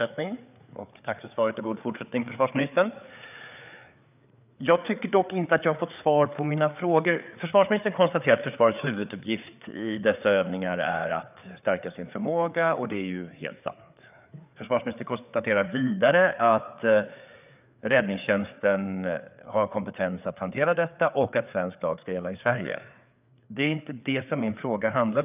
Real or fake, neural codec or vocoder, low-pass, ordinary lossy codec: fake; codec, 16 kHz, 4 kbps, FreqCodec, larger model; 3.6 kHz; none